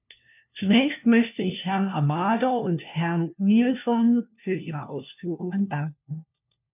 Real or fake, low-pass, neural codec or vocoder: fake; 3.6 kHz; codec, 16 kHz, 1 kbps, FunCodec, trained on LibriTTS, 50 frames a second